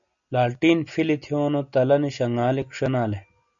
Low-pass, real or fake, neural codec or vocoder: 7.2 kHz; real; none